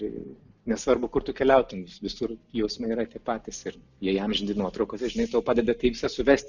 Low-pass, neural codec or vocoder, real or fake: 7.2 kHz; none; real